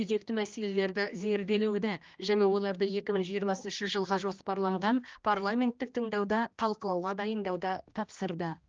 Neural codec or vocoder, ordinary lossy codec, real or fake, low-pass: codec, 16 kHz, 1 kbps, X-Codec, HuBERT features, trained on general audio; Opus, 24 kbps; fake; 7.2 kHz